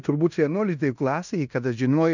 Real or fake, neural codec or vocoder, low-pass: fake; codec, 16 kHz in and 24 kHz out, 0.9 kbps, LongCat-Audio-Codec, fine tuned four codebook decoder; 7.2 kHz